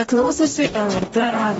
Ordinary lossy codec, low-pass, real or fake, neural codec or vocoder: AAC, 24 kbps; 19.8 kHz; fake; codec, 44.1 kHz, 0.9 kbps, DAC